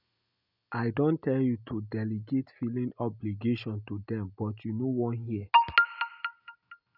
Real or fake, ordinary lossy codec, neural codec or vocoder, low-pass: real; none; none; 5.4 kHz